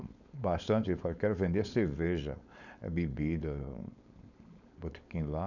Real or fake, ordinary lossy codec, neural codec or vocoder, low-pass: fake; none; codec, 16 kHz, 4.8 kbps, FACodec; 7.2 kHz